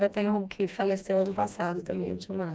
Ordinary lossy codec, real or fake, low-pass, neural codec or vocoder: none; fake; none; codec, 16 kHz, 1 kbps, FreqCodec, smaller model